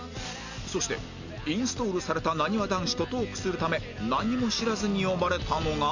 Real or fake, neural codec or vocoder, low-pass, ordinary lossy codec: real; none; 7.2 kHz; none